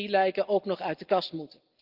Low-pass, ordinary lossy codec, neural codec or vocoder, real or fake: 5.4 kHz; Opus, 32 kbps; none; real